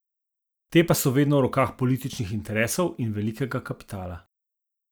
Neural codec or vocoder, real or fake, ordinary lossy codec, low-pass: none; real; none; none